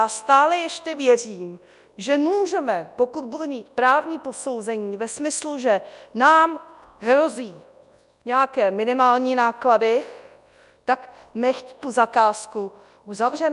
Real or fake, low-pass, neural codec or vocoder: fake; 10.8 kHz; codec, 24 kHz, 0.9 kbps, WavTokenizer, large speech release